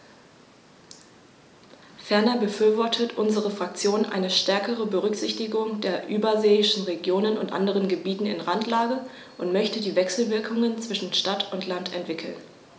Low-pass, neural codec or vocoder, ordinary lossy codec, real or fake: none; none; none; real